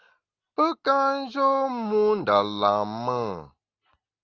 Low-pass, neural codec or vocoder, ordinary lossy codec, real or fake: 7.2 kHz; none; Opus, 24 kbps; real